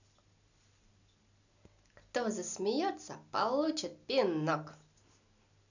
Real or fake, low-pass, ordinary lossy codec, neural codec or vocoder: real; 7.2 kHz; none; none